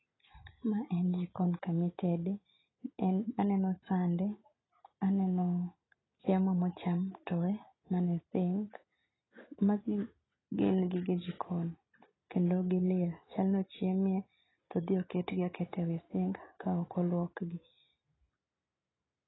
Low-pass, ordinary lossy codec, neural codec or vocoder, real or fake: 7.2 kHz; AAC, 16 kbps; none; real